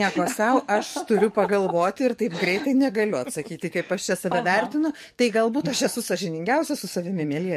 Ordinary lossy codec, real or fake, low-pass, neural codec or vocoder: MP3, 64 kbps; fake; 14.4 kHz; codec, 44.1 kHz, 7.8 kbps, DAC